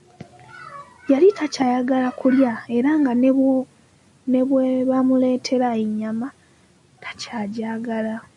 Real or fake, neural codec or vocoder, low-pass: real; none; 10.8 kHz